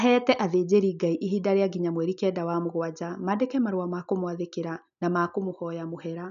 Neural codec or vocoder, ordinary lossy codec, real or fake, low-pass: none; none; real; 7.2 kHz